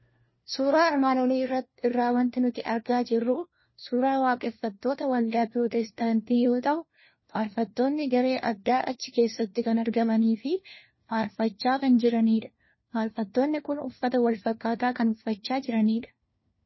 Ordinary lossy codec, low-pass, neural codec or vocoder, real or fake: MP3, 24 kbps; 7.2 kHz; codec, 16 kHz, 1 kbps, FunCodec, trained on LibriTTS, 50 frames a second; fake